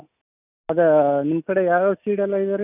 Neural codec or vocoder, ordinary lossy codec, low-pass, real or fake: none; none; 3.6 kHz; real